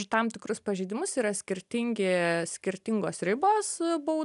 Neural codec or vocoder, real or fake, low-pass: none; real; 10.8 kHz